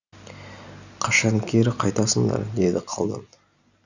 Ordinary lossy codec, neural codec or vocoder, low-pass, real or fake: none; none; 7.2 kHz; real